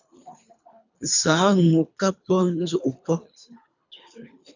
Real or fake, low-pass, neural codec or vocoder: fake; 7.2 kHz; codec, 24 kHz, 3 kbps, HILCodec